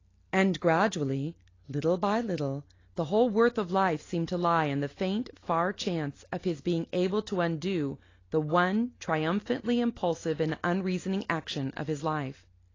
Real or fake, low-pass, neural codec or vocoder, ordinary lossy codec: real; 7.2 kHz; none; AAC, 32 kbps